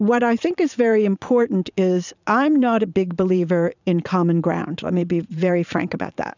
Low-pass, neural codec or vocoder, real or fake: 7.2 kHz; vocoder, 44.1 kHz, 80 mel bands, Vocos; fake